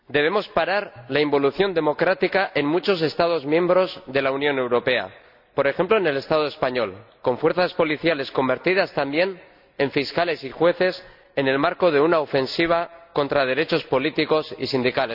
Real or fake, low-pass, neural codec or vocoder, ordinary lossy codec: real; 5.4 kHz; none; none